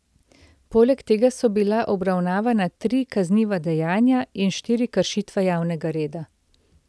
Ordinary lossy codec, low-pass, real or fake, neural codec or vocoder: none; none; real; none